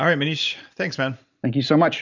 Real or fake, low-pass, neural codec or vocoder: real; 7.2 kHz; none